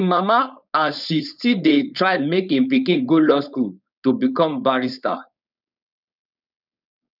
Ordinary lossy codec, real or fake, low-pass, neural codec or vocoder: none; fake; 5.4 kHz; codec, 16 kHz, 4.8 kbps, FACodec